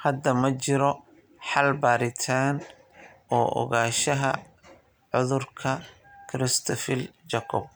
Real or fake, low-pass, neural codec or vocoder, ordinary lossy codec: real; none; none; none